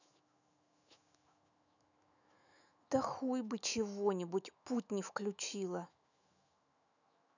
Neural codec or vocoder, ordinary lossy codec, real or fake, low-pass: autoencoder, 48 kHz, 128 numbers a frame, DAC-VAE, trained on Japanese speech; none; fake; 7.2 kHz